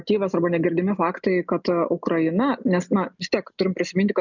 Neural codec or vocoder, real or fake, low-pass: none; real; 7.2 kHz